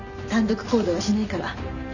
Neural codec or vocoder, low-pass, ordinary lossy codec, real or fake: none; 7.2 kHz; none; real